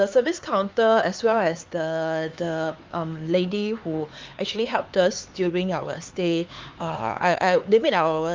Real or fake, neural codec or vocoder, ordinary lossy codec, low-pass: fake; codec, 16 kHz, 4 kbps, X-Codec, HuBERT features, trained on LibriSpeech; Opus, 32 kbps; 7.2 kHz